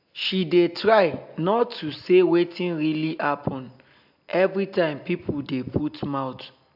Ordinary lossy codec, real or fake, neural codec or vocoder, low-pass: AAC, 48 kbps; real; none; 5.4 kHz